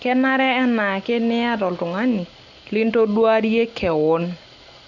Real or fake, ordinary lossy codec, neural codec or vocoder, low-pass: real; none; none; 7.2 kHz